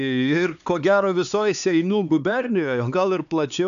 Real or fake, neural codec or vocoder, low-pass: fake; codec, 16 kHz, 4 kbps, X-Codec, HuBERT features, trained on LibriSpeech; 7.2 kHz